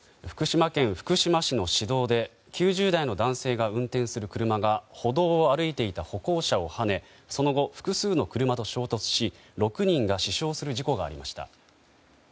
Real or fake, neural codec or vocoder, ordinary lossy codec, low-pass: real; none; none; none